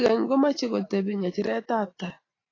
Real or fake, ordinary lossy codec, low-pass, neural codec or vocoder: real; AAC, 32 kbps; 7.2 kHz; none